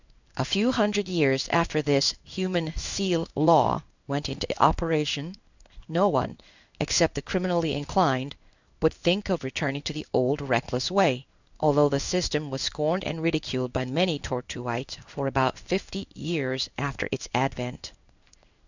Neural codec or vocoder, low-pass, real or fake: codec, 16 kHz in and 24 kHz out, 1 kbps, XY-Tokenizer; 7.2 kHz; fake